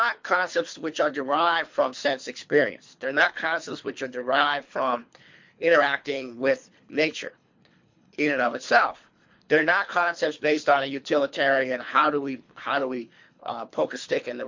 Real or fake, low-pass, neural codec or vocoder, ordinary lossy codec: fake; 7.2 kHz; codec, 24 kHz, 3 kbps, HILCodec; MP3, 48 kbps